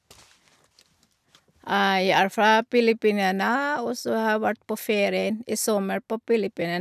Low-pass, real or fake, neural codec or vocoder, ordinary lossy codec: 14.4 kHz; real; none; none